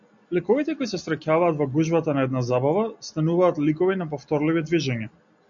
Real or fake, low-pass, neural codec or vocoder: real; 7.2 kHz; none